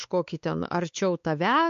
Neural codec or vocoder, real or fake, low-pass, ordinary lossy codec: codec, 16 kHz, 4 kbps, X-Codec, WavLM features, trained on Multilingual LibriSpeech; fake; 7.2 kHz; MP3, 48 kbps